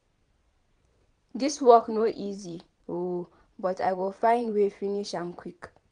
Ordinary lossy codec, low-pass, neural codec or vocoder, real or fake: none; 9.9 kHz; vocoder, 22.05 kHz, 80 mel bands, Vocos; fake